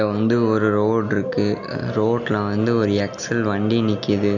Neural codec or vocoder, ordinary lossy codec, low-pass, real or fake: none; none; 7.2 kHz; real